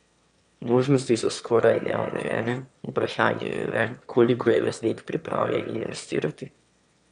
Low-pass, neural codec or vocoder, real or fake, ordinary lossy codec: 9.9 kHz; autoencoder, 22.05 kHz, a latent of 192 numbers a frame, VITS, trained on one speaker; fake; none